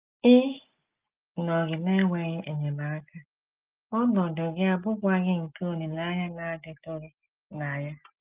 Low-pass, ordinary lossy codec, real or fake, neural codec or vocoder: 3.6 kHz; Opus, 32 kbps; real; none